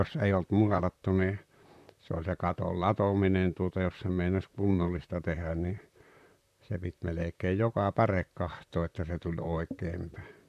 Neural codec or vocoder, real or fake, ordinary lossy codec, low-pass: vocoder, 44.1 kHz, 128 mel bands, Pupu-Vocoder; fake; none; 14.4 kHz